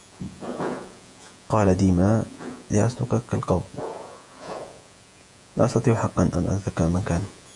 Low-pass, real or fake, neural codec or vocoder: 10.8 kHz; fake; vocoder, 48 kHz, 128 mel bands, Vocos